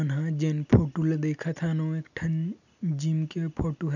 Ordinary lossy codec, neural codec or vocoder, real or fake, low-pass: none; none; real; 7.2 kHz